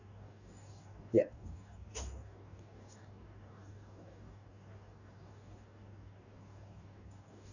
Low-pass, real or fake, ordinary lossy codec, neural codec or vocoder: 7.2 kHz; fake; none; codec, 44.1 kHz, 2.6 kbps, DAC